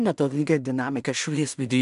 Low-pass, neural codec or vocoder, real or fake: 10.8 kHz; codec, 16 kHz in and 24 kHz out, 0.4 kbps, LongCat-Audio-Codec, two codebook decoder; fake